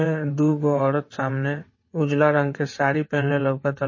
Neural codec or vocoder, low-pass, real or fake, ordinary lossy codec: vocoder, 22.05 kHz, 80 mel bands, WaveNeXt; 7.2 kHz; fake; MP3, 32 kbps